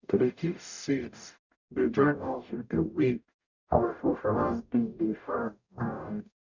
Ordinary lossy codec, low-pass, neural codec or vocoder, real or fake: none; 7.2 kHz; codec, 44.1 kHz, 0.9 kbps, DAC; fake